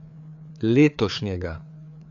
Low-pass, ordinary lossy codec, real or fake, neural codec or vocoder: 7.2 kHz; none; fake; codec, 16 kHz, 4 kbps, FreqCodec, larger model